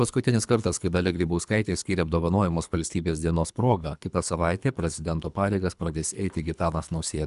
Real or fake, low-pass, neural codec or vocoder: fake; 10.8 kHz; codec, 24 kHz, 3 kbps, HILCodec